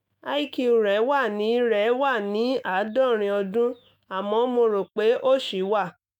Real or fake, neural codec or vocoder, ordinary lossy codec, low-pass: fake; autoencoder, 48 kHz, 128 numbers a frame, DAC-VAE, trained on Japanese speech; none; 19.8 kHz